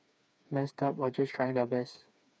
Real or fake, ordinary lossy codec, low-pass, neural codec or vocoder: fake; none; none; codec, 16 kHz, 4 kbps, FreqCodec, smaller model